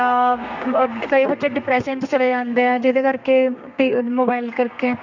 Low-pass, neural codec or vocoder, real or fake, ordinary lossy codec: 7.2 kHz; codec, 32 kHz, 1.9 kbps, SNAC; fake; none